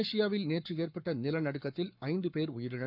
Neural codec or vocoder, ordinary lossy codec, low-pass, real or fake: codec, 44.1 kHz, 7.8 kbps, Pupu-Codec; none; 5.4 kHz; fake